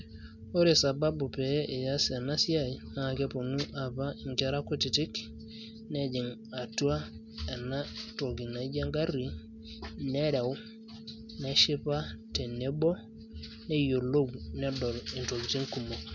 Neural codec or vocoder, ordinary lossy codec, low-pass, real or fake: none; none; 7.2 kHz; real